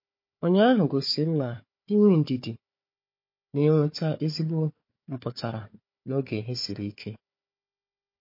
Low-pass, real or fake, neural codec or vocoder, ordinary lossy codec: 5.4 kHz; fake; codec, 16 kHz, 4 kbps, FunCodec, trained on Chinese and English, 50 frames a second; MP3, 32 kbps